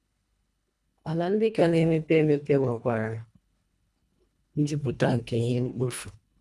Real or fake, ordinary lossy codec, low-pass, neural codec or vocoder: fake; none; none; codec, 24 kHz, 1.5 kbps, HILCodec